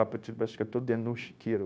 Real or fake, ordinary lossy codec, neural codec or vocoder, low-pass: fake; none; codec, 16 kHz, 0.9 kbps, LongCat-Audio-Codec; none